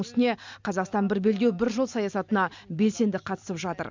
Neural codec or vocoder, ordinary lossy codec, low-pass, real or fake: none; MP3, 64 kbps; 7.2 kHz; real